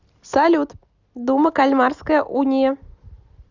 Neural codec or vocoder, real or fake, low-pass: none; real; 7.2 kHz